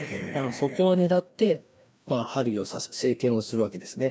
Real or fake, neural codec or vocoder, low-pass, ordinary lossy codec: fake; codec, 16 kHz, 1 kbps, FreqCodec, larger model; none; none